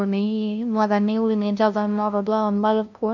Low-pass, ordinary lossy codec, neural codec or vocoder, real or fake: 7.2 kHz; none; codec, 16 kHz, 0.5 kbps, FunCodec, trained on LibriTTS, 25 frames a second; fake